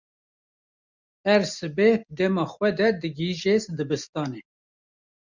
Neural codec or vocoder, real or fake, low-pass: none; real; 7.2 kHz